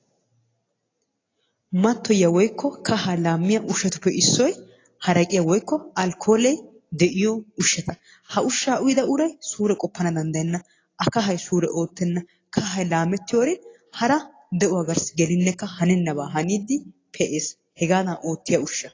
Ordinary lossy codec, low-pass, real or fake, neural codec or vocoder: AAC, 32 kbps; 7.2 kHz; real; none